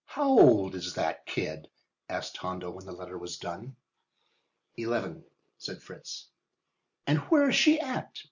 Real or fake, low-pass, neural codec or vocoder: real; 7.2 kHz; none